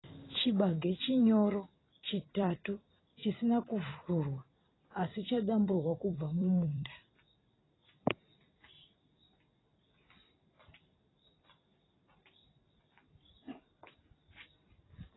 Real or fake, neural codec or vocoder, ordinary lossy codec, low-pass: fake; vocoder, 44.1 kHz, 128 mel bands every 256 samples, BigVGAN v2; AAC, 16 kbps; 7.2 kHz